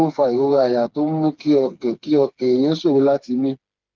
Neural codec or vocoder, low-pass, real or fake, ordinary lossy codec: codec, 16 kHz, 4 kbps, FreqCodec, smaller model; 7.2 kHz; fake; Opus, 32 kbps